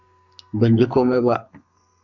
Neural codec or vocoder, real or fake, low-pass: codec, 32 kHz, 1.9 kbps, SNAC; fake; 7.2 kHz